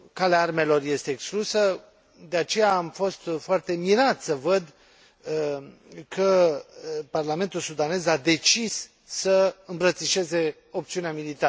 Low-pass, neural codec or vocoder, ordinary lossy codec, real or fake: none; none; none; real